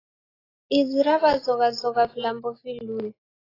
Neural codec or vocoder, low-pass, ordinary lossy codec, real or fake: none; 5.4 kHz; AAC, 24 kbps; real